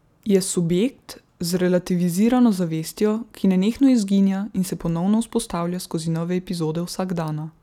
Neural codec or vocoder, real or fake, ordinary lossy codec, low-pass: none; real; none; 19.8 kHz